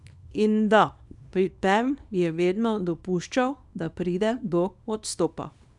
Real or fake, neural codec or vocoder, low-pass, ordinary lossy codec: fake; codec, 24 kHz, 0.9 kbps, WavTokenizer, small release; 10.8 kHz; none